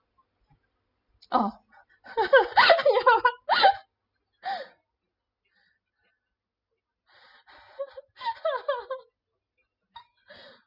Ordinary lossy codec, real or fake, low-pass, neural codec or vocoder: Opus, 64 kbps; real; 5.4 kHz; none